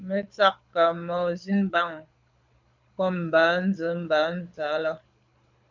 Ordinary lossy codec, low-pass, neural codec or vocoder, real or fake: MP3, 64 kbps; 7.2 kHz; codec, 24 kHz, 6 kbps, HILCodec; fake